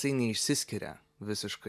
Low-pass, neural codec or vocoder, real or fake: 14.4 kHz; none; real